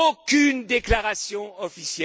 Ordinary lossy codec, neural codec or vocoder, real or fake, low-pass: none; none; real; none